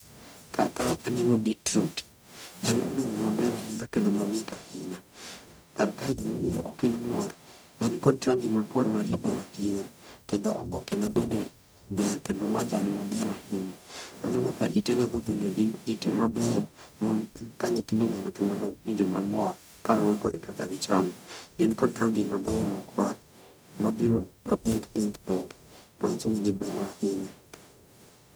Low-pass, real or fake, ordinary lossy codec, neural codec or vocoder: none; fake; none; codec, 44.1 kHz, 0.9 kbps, DAC